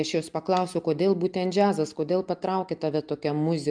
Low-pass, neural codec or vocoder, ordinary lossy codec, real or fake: 9.9 kHz; none; Opus, 32 kbps; real